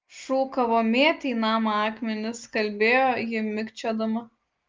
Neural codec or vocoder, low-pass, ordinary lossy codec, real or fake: none; 7.2 kHz; Opus, 32 kbps; real